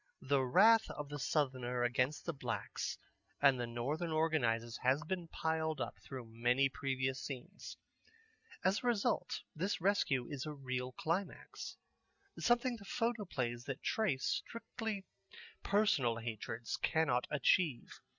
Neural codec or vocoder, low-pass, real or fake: none; 7.2 kHz; real